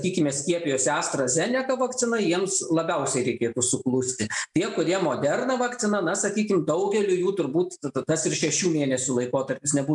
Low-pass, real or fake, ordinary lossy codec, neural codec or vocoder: 10.8 kHz; real; MP3, 96 kbps; none